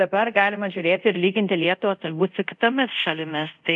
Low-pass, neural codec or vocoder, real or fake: 10.8 kHz; codec, 24 kHz, 0.5 kbps, DualCodec; fake